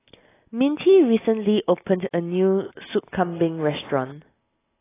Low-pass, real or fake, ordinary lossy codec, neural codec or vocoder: 3.6 kHz; real; AAC, 16 kbps; none